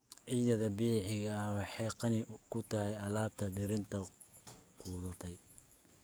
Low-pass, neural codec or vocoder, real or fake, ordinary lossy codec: none; codec, 44.1 kHz, 7.8 kbps, DAC; fake; none